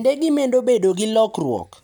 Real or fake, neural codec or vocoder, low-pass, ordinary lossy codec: real; none; 19.8 kHz; none